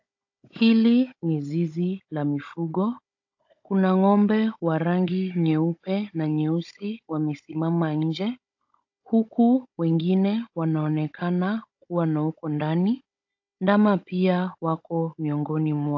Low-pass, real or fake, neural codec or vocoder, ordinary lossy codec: 7.2 kHz; fake; codec, 16 kHz, 16 kbps, FunCodec, trained on Chinese and English, 50 frames a second; AAC, 48 kbps